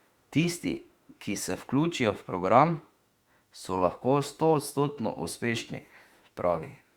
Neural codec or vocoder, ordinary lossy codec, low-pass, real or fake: autoencoder, 48 kHz, 32 numbers a frame, DAC-VAE, trained on Japanese speech; Opus, 64 kbps; 19.8 kHz; fake